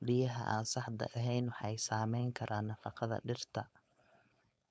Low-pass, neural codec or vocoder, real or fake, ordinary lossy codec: none; codec, 16 kHz, 4.8 kbps, FACodec; fake; none